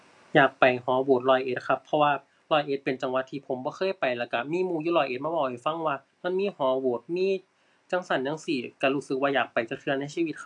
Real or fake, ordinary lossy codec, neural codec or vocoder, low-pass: real; AAC, 64 kbps; none; 10.8 kHz